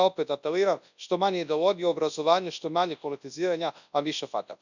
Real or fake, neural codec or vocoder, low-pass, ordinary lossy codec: fake; codec, 24 kHz, 0.9 kbps, WavTokenizer, large speech release; 7.2 kHz; none